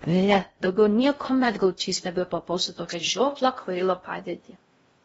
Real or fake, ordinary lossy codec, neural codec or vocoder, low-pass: fake; AAC, 24 kbps; codec, 16 kHz in and 24 kHz out, 0.6 kbps, FocalCodec, streaming, 4096 codes; 10.8 kHz